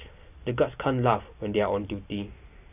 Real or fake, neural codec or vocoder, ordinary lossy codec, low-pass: real; none; none; 3.6 kHz